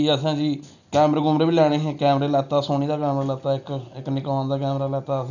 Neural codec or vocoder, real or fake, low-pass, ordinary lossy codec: none; real; 7.2 kHz; none